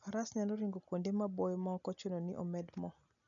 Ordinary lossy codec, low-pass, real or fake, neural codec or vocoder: none; 7.2 kHz; real; none